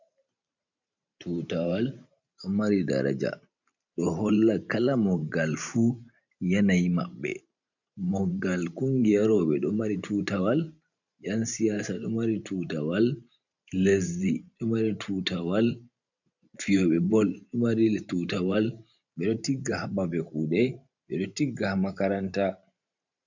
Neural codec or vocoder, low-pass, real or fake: vocoder, 44.1 kHz, 128 mel bands every 512 samples, BigVGAN v2; 7.2 kHz; fake